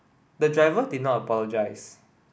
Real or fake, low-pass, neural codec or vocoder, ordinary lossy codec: real; none; none; none